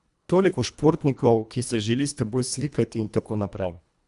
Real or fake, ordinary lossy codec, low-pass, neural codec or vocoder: fake; none; 10.8 kHz; codec, 24 kHz, 1.5 kbps, HILCodec